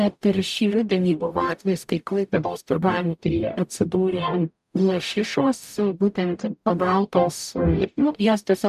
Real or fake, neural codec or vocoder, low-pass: fake; codec, 44.1 kHz, 0.9 kbps, DAC; 14.4 kHz